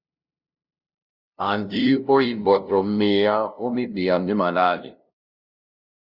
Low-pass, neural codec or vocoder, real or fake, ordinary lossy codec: 5.4 kHz; codec, 16 kHz, 0.5 kbps, FunCodec, trained on LibriTTS, 25 frames a second; fake; Opus, 64 kbps